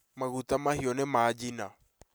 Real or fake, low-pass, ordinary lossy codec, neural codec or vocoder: real; none; none; none